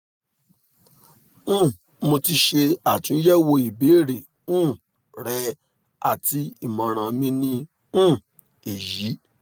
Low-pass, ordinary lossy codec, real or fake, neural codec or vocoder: none; none; real; none